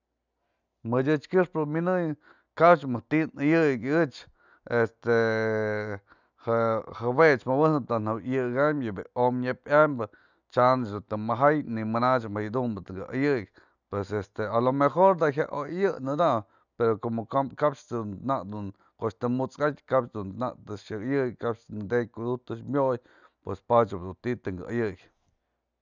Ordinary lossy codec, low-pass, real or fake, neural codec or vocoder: none; 7.2 kHz; real; none